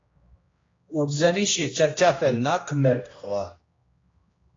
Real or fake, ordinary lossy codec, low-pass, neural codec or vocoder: fake; AAC, 32 kbps; 7.2 kHz; codec, 16 kHz, 1 kbps, X-Codec, HuBERT features, trained on general audio